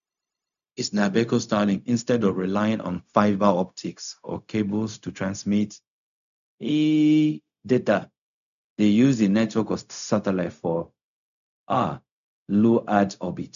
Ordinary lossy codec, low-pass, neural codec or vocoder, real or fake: none; 7.2 kHz; codec, 16 kHz, 0.4 kbps, LongCat-Audio-Codec; fake